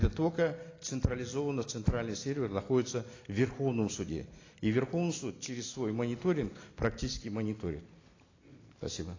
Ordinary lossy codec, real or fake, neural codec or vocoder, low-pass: AAC, 32 kbps; real; none; 7.2 kHz